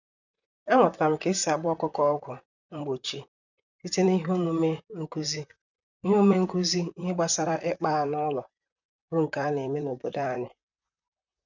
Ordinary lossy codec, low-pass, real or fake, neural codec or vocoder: none; 7.2 kHz; fake; vocoder, 44.1 kHz, 128 mel bands, Pupu-Vocoder